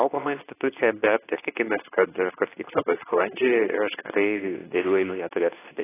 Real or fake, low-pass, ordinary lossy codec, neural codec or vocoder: fake; 3.6 kHz; AAC, 16 kbps; codec, 24 kHz, 0.9 kbps, WavTokenizer, medium speech release version 2